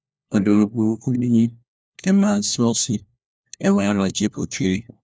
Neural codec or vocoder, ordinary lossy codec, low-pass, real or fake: codec, 16 kHz, 1 kbps, FunCodec, trained on LibriTTS, 50 frames a second; none; none; fake